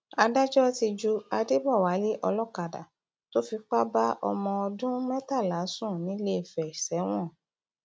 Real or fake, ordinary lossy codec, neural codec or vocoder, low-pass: real; none; none; none